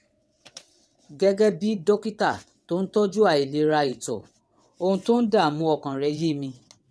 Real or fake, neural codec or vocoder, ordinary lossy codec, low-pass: fake; vocoder, 22.05 kHz, 80 mel bands, WaveNeXt; none; none